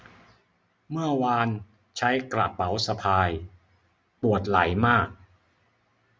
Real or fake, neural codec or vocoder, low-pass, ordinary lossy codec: real; none; none; none